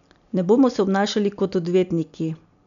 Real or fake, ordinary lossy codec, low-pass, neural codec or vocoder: real; none; 7.2 kHz; none